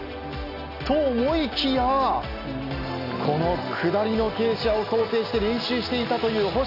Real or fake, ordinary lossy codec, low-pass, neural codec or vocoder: real; none; 5.4 kHz; none